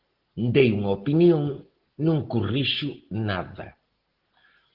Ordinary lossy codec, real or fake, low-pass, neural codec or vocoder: Opus, 16 kbps; real; 5.4 kHz; none